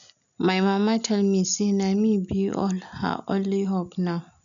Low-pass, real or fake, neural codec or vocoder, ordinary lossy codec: 7.2 kHz; real; none; none